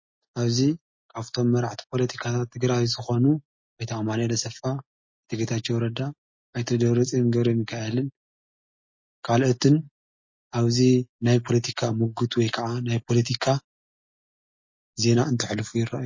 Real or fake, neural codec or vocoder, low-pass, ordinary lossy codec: real; none; 7.2 kHz; MP3, 32 kbps